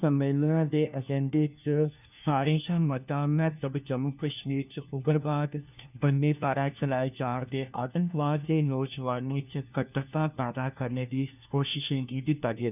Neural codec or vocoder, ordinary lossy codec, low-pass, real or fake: codec, 16 kHz, 1 kbps, FunCodec, trained on LibriTTS, 50 frames a second; none; 3.6 kHz; fake